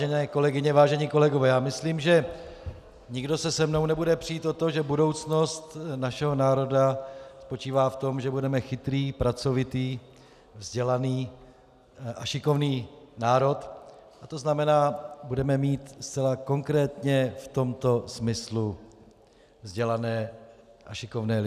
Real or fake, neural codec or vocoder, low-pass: real; none; 14.4 kHz